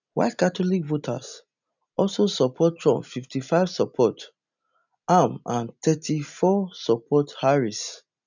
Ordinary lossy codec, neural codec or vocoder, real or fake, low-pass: none; none; real; 7.2 kHz